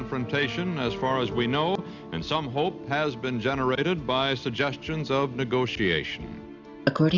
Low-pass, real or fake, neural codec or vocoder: 7.2 kHz; real; none